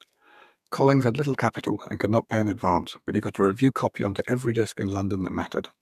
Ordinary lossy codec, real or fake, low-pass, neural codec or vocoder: none; fake; 14.4 kHz; codec, 32 kHz, 1.9 kbps, SNAC